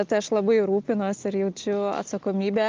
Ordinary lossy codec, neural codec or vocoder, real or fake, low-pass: Opus, 24 kbps; none; real; 7.2 kHz